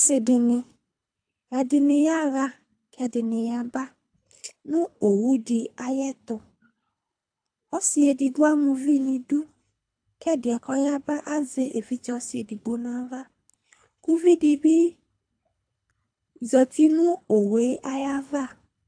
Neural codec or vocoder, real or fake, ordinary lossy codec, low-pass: codec, 24 kHz, 3 kbps, HILCodec; fake; AAC, 64 kbps; 9.9 kHz